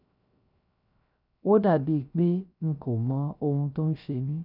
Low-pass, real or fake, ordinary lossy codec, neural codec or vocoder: 5.4 kHz; fake; none; codec, 16 kHz, 0.3 kbps, FocalCodec